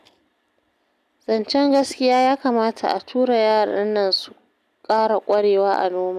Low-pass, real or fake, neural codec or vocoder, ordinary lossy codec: 14.4 kHz; real; none; none